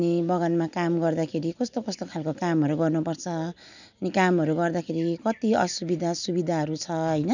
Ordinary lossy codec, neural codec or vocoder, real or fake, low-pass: none; none; real; 7.2 kHz